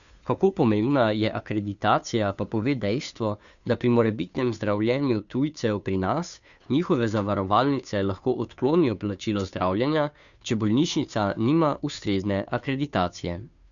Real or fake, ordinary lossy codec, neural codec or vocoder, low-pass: fake; none; codec, 16 kHz, 2 kbps, FunCodec, trained on Chinese and English, 25 frames a second; 7.2 kHz